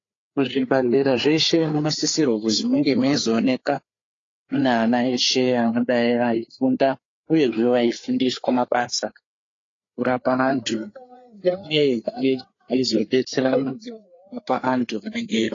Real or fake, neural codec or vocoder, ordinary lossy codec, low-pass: fake; codec, 16 kHz, 4 kbps, FreqCodec, larger model; AAC, 32 kbps; 7.2 kHz